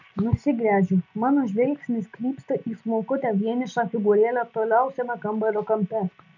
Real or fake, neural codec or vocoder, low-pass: real; none; 7.2 kHz